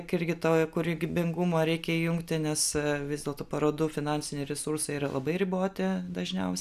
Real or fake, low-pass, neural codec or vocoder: real; 14.4 kHz; none